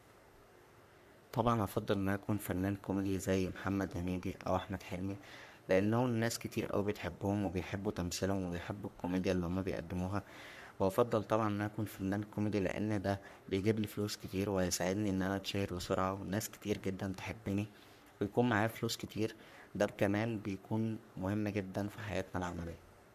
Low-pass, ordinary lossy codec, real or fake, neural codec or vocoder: 14.4 kHz; none; fake; codec, 44.1 kHz, 3.4 kbps, Pupu-Codec